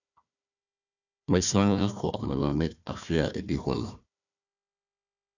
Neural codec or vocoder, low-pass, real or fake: codec, 16 kHz, 1 kbps, FunCodec, trained on Chinese and English, 50 frames a second; 7.2 kHz; fake